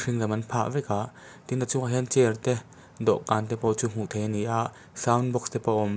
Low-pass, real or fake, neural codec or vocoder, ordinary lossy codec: none; real; none; none